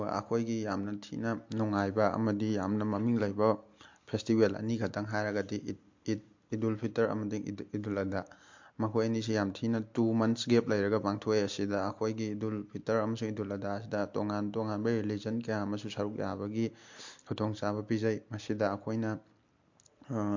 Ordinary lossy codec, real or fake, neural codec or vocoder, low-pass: MP3, 48 kbps; real; none; 7.2 kHz